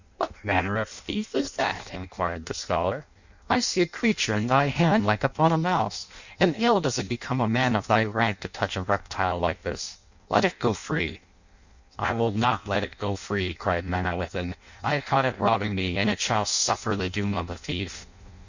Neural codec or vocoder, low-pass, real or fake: codec, 16 kHz in and 24 kHz out, 0.6 kbps, FireRedTTS-2 codec; 7.2 kHz; fake